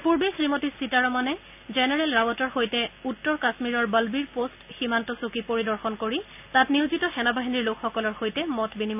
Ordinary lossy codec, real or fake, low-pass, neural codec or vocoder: none; real; 3.6 kHz; none